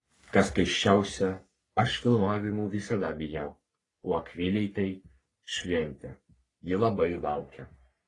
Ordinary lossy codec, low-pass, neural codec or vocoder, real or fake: AAC, 32 kbps; 10.8 kHz; codec, 44.1 kHz, 3.4 kbps, Pupu-Codec; fake